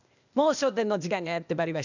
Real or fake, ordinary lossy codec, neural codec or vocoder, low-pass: fake; none; codec, 16 kHz, 0.8 kbps, ZipCodec; 7.2 kHz